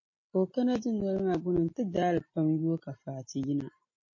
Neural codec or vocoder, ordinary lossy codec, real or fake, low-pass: none; MP3, 32 kbps; real; 7.2 kHz